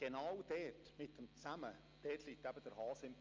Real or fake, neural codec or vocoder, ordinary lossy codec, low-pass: real; none; Opus, 32 kbps; 7.2 kHz